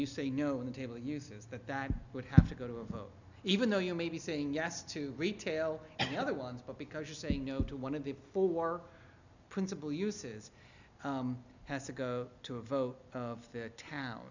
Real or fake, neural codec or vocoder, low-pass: real; none; 7.2 kHz